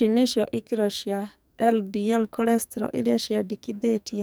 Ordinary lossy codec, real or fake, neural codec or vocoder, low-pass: none; fake; codec, 44.1 kHz, 2.6 kbps, SNAC; none